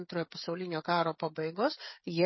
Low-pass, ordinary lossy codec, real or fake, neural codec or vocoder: 7.2 kHz; MP3, 24 kbps; fake; codec, 16 kHz, 16 kbps, FreqCodec, smaller model